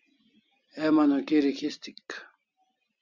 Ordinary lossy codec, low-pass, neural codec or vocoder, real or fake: Opus, 64 kbps; 7.2 kHz; none; real